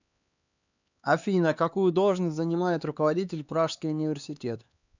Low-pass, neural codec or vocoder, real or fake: 7.2 kHz; codec, 16 kHz, 4 kbps, X-Codec, HuBERT features, trained on LibriSpeech; fake